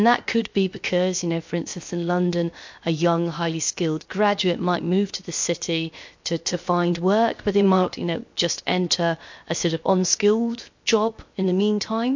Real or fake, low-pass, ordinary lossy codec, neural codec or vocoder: fake; 7.2 kHz; MP3, 48 kbps; codec, 16 kHz, 0.7 kbps, FocalCodec